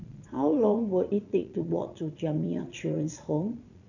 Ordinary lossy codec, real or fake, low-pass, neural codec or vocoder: none; fake; 7.2 kHz; vocoder, 22.05 kHz, 80 mel bands, Vocos